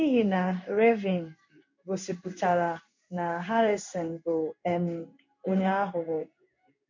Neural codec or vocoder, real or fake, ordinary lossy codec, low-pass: codec, 16 kHz in and 24 kHz out, 1 kbps, XY-Tokenizer; fake; MP3, 48 kbps; 7.2 kHz